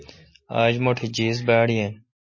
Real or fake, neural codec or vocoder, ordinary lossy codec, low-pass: real; none; MP3, 32 kbps; 7.2 kHz